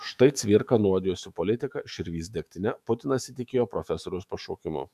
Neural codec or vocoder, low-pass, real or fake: autoencoder, 48 kHz, 128 numbers a frame, DAC-VAE, trained on Japanese speech; 14.4 kHz; fake